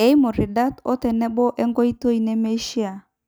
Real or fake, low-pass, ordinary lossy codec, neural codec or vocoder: real; none; none; none